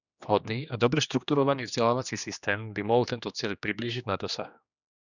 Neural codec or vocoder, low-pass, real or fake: codec, 16 kHz, 2 kbps, X-Codec, HuBERT features, trained on general audio; 7.2 kHz; fake